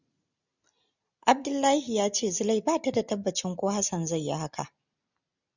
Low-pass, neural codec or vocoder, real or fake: 7.2 kHz; none; real